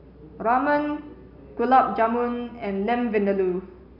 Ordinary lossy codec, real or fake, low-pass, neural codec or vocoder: none; real; 5.4 kHz; none